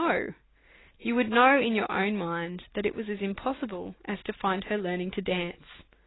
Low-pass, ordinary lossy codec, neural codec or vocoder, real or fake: 7.2 kHz; AAC, 16 kbps; none; real